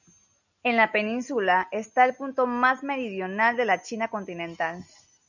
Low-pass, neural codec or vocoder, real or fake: 7.2 kHz; none; real